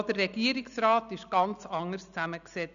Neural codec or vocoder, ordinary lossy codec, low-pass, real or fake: none; none; 7.2 kHz; real